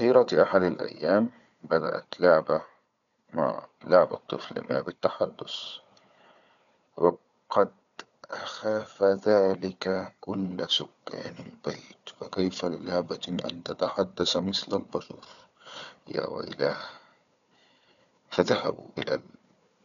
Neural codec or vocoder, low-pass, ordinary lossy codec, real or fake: codec, 16 kHz, 4 kbps, FunCodec, trained on Chinese and English, 50 frames a second; 7.2 kHz; none; fake